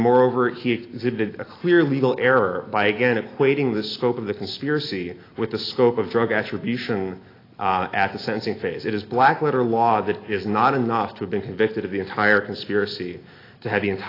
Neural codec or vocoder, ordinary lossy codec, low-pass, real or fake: none; AAC, 24 kbps; 5.4 kHz; real